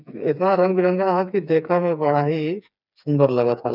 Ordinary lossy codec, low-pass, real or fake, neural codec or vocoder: none; 5.4 kHz; fake; codec, 16 kHz, 4 kbps, FreqCodec, smaller model